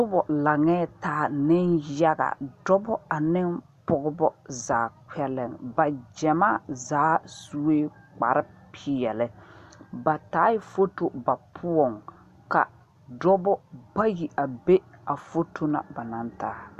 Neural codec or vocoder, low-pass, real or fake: none; 14.4 kHz; real